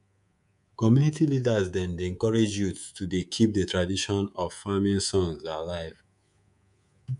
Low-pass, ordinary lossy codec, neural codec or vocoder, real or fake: 10.8 kHz; none; codec, 24 kHz, 3.1 kbps, DualCodec; fake